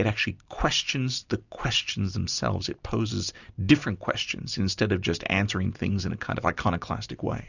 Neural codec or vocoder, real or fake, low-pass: none; real; 7.2 kHz